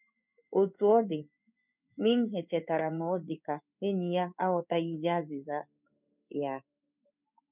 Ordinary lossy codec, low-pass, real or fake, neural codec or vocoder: none; 3.6 kHz; fake; codec, 16 kHz in and 24 kHz out, 1 kbps, XY-Tokenizer